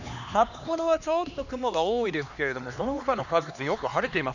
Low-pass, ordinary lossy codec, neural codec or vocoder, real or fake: 7.2 kHz; none; codec, 16 kHz, 2 kbps, X-Codec, HuBERT features, trained on LibriSpeech; fake